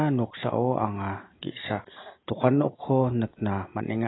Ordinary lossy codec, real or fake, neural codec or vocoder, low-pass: AAC, 16 kbps; real; none; 7.2 kHz